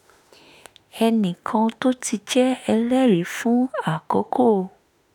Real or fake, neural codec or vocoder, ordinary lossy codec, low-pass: fake; autoencoder, 48 kHz, 32 numbers a frame, DAC-VAE, trained on Japanese speech; none; none